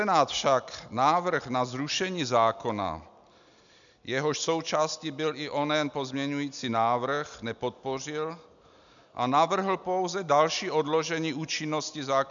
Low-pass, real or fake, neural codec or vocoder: 7.2 kHz; real; none